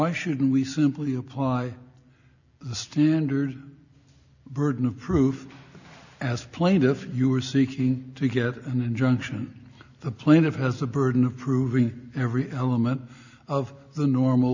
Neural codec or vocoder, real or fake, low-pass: none; real; 7.2 kHz